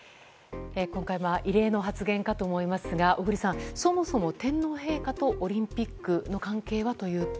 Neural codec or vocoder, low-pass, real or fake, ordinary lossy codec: none; none; real; none